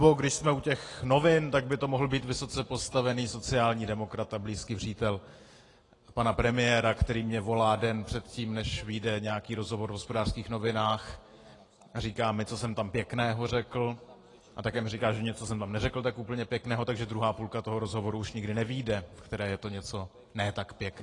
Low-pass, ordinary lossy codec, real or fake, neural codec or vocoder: 10.8 kHz; AAC, 32 kbps; real; none